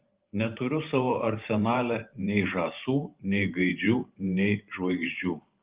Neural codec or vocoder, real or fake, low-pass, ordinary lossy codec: vocoder, 44.1 kHz, 128 mel bands every 512 samples, BigVGAN v2; fake; 3.6 kHz; Opus, 32 kbps